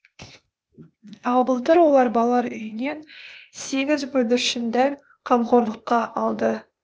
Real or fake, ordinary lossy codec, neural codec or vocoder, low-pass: fake; none; codec, 16 kHz, 0.8 kbps, ZipCodec; none